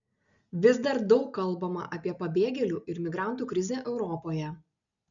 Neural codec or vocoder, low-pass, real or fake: none; 7.2 kHz; real